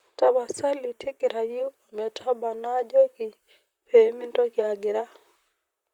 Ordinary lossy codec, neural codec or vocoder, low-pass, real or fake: Opus, 64 kbps; vocoder, 44.1 kHz, 128 mel bands, Pupu-Vocoder; 19.8 kHz; fake